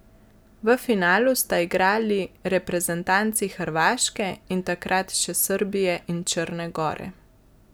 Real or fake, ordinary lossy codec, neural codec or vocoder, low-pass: real; none; none; none